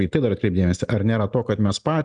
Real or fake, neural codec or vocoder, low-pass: real; none; 9.9 kHz